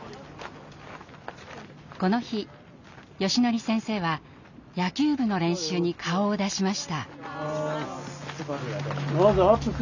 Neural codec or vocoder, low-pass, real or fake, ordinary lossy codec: none; 7.2 kHz; real; none